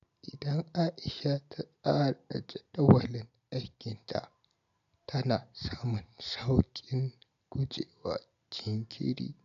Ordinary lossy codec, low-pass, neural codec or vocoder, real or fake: none; 7.2 kHz; none; real